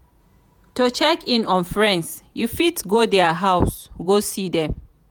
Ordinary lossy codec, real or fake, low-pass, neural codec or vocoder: none; fake; none; vocoder, 48 kHz, 128 mel bands, Vocos